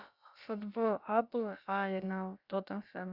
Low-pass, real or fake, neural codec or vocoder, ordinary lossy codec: 5.4 kHz; fake; codec, 16 kHz, about 1 kbps, DyCAST, with the encoder's durations; Opus, 64 kbps